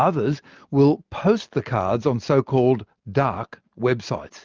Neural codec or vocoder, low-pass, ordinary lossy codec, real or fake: none; 7.2 kHz; Opus, 32 kbps; real